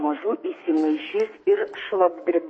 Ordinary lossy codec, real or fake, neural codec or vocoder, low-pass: MP3, 32 kbps; fake; codec, 32 kHz, 1.9 kbps, SNAC; 9.9 kHz